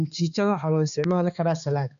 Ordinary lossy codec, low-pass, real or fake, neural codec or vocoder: none; 7.2 kHz; fake; codec, 16 kHz, 2 kbps, X-Codec, HuBERT features, trained on balanced general audio